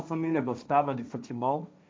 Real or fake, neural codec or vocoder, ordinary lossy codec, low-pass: fake; codec, 16 kHz, 1.1 kbps, Voila-Tokenizer; none; none